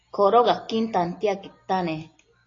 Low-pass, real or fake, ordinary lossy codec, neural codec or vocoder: 7.2 kHz; real; AAC, 32 kbps; none